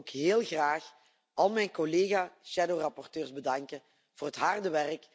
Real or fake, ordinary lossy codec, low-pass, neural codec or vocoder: real; none; none; none